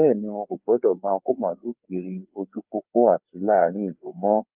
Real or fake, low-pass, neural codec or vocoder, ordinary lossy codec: fake; 3.6 kHz; codec, 16 kHz, 4 kbps, FreqCodec, larger model; Opus, 32 kbps